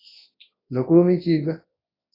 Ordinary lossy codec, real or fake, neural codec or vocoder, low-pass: AAC, 24 kbps; fake; codec, 24 kHz, 0.9 kbps, WavTokenizer, large speech release; 5.4 kHz